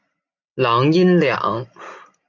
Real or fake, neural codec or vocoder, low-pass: real; none; 7.2 kHz